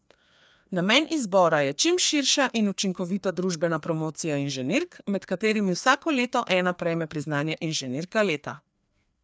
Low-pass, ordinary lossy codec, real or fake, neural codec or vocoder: none; none; fake; codec, 16 kHz, 2 kbps, FreqCodec, larger model